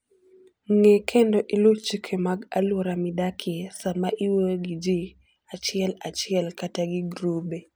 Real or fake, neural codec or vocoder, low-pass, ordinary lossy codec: real; none; none; none